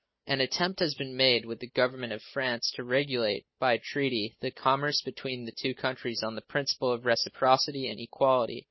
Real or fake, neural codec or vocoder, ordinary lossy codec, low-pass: real; none; MP3, 24 kbps; 7.2 kHz